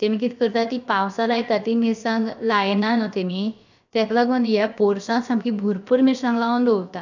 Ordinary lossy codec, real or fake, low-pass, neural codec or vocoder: none; fake; 7.2 kHz; codec, 16 kHz, about 1 kbps, DyCAST, with the encoder's durations